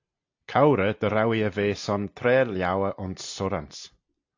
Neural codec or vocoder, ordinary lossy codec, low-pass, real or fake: none; AAC, 48 kbps; 7.2 kHz; real